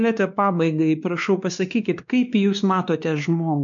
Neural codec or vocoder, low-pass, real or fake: codec, 16 kHz, 2 kbps, X-Codec, WavLM features, trained on Multilingual LibriSpeech; 7.2 kHz; fake